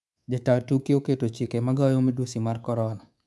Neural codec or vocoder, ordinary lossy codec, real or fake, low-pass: codec, 24 kHz, 3.1 kbps, DualCodec; none; fake; none